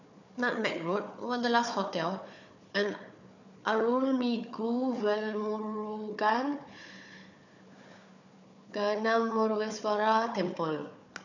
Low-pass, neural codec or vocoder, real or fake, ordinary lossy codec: 7.2 kHz; codec, 16 kHz, 4 kbps, FunCodec, trained on Chinese and English, 50 frames a second; fake; none